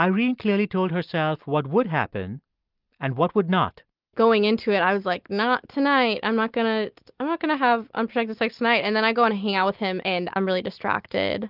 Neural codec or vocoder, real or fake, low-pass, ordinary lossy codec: none; real; 5.4 kHz; Opus, 24 kbps